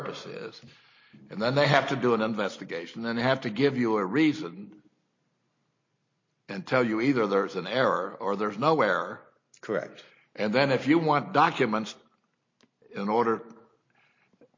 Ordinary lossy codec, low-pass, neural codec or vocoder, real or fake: MP3, 32 kbps; 7.2 kHz; none; real